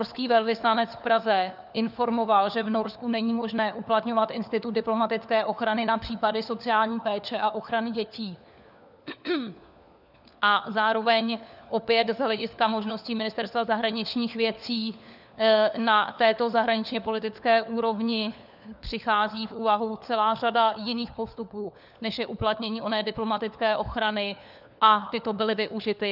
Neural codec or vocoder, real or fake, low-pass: codec, 16 kHz, 4 kbps, FunCodec, trained on LibriTTS, 50 frames a second; fake; 5.4 kHz